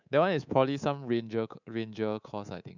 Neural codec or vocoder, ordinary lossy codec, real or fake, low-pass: codec, 24 kHz, 3.1 kbps, DualCodec; none; fake; 7.2 kHz